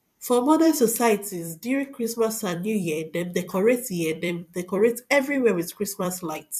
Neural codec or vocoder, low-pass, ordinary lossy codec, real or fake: vocoder, 44.1 kHz, 128 mel bands every 256 samples, BigVGAN v2; 14.4 kHz; MP3, 96 kbps; fake